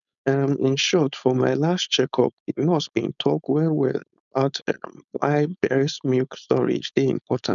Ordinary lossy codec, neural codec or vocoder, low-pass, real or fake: none; codec, 16 kHz, 4.8 kbps, FACodec; 7.2 kHz; fake